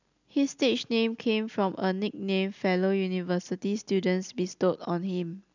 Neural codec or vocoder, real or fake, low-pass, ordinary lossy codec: none; real; 7.2 kHz; none